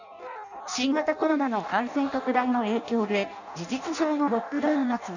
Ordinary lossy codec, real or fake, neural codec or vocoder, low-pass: none; fake; codec, 16 kHz in and 24 kHz out, 0.6 kbps, FireRedTTS-2 codec; 7.2 kHz